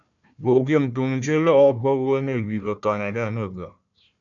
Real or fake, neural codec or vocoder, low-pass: fake; codec, 16 kHz, 1 kbps, FunCodec, trained on Chinese and English, 50 frames a second; 7.2 kHz